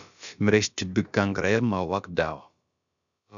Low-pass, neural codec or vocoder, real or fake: 7.2 kHz; codec, 16 kHz, about 1 kbps, DyCAST, with the encoder's durations; fake